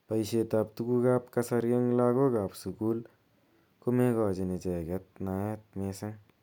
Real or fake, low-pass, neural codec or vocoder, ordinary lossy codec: real; 19.8 kHz; none; none